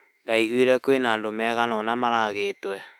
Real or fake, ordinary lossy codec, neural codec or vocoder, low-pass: fake; none; autoencoder, 48 kHz, 32 numbers a frame, DAC-VAE, trained on Japanese speech; 19.8 kHz